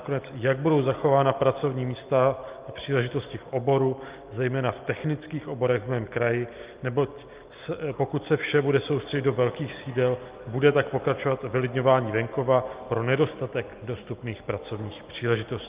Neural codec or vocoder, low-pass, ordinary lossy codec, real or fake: none; 3.6 kHz; Opus, 32 kbps; real